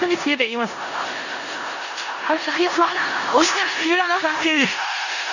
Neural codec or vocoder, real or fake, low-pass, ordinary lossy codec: codec, 16 kHz in and 24 kHz out, 0.4 kbps, LongCat-Audio-Codec, four codebook decoder; fake; 7.2 kHz; none